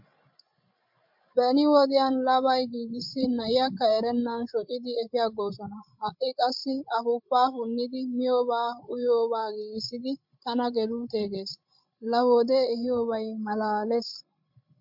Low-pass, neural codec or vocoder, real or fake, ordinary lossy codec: 5.4 kHz; codec, 16 kHz, 16 kbps, FreqCodec, larger model; fake; MP3, 48 kbps